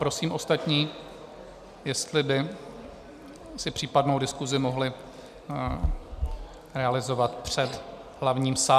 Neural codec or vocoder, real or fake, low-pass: none; real; 14.4 kHz